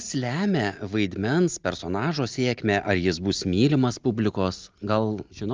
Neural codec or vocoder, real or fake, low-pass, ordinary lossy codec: none; real; 7.2 kHz; Opus, 24 kbps